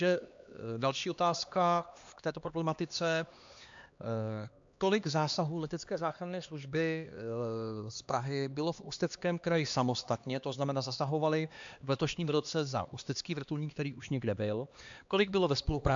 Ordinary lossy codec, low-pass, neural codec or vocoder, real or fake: AAC, 64 kbps; 7.2 kHz; codec, 16 kHz, 2 kbps, X-Codec, HuBERT features, trained on LibriSpeech; fake